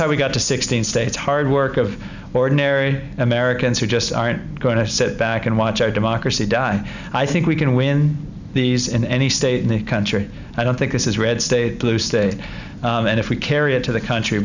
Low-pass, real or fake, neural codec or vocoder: 7.2 kHz; real; none